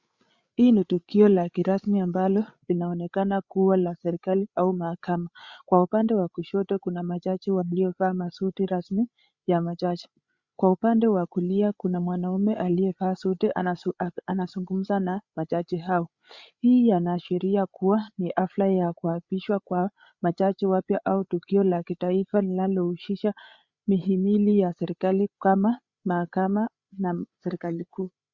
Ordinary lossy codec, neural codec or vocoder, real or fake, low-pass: Opus, 64 kbps; codec, 16 kHz, 8 kbps, FreqCodec, larger model; fake; 7.2 kHz